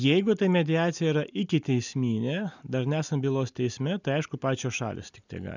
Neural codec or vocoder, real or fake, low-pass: none; real; 7.2 kHz